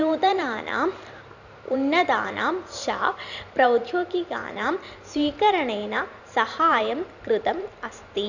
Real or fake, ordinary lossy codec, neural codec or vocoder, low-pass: fake; none; vocoder, 44.1 kHz, 128 mel bands every 512 samples, BigVGAN v2; 7.2 kHz